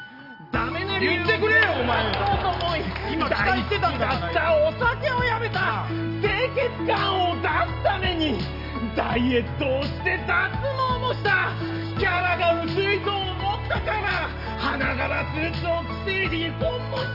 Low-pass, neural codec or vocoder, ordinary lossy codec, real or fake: 5.4 kHz; none; none; real